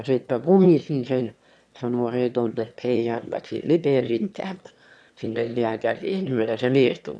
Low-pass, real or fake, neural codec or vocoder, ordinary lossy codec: none; fake; autoencoder, 22.05 kHz, a latent of 192 numbers a frame, VITS, trained on one speaker; none